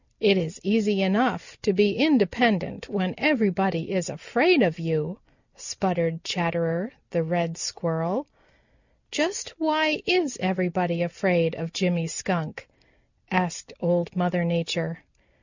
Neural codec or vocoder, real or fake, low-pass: none; real; 7.2 kHz